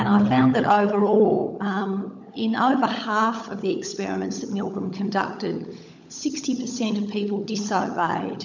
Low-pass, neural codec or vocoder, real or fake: 7.2 kHz; codec, 16 kHz, 16 kbps, FunCodec, trained on LibriTTS, 50 frames a second; fake